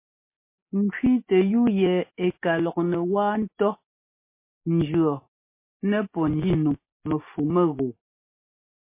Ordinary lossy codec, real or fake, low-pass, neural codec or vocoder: MP3, 24 kbps; real; 3.6 kHz; none